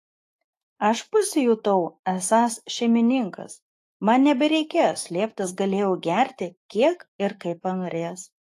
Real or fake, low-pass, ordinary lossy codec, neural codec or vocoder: fake; 14.4 kHz; AAC, 48 kbps; autoencoder, 48 kHz, 128 numbers a frame, DAC-VAE, trained on Japanese speech